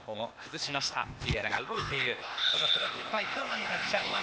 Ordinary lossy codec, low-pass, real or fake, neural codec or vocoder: none; none; fake; codec, 16 kHz, 0.8 kbps, ZipCodec